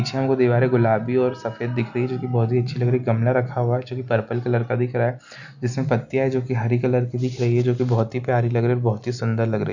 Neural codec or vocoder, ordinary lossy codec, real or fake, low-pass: none; none; real; 7.2 kHz